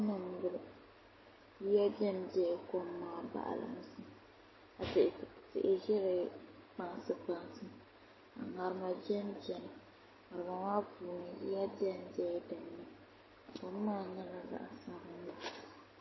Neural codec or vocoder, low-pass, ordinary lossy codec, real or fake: none; 7.2 kHz; MP3, 24 kbps; real